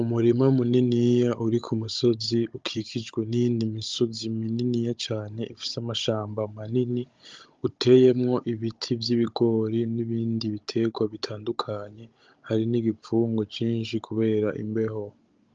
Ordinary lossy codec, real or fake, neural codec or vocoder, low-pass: Opus, 24 kbps; fake; codec, 16 kHz, 16 kbps, FunCodec, trained on Chinese and English, 50 frames a second; 7.2 kHz